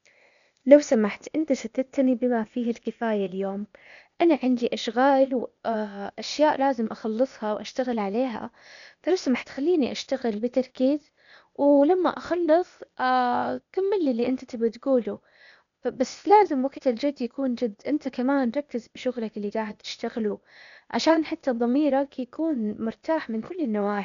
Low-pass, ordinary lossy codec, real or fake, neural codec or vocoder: 7.2 kHz; none; fake; codec, 16 kHz, 0.8 kbps, ZipCodec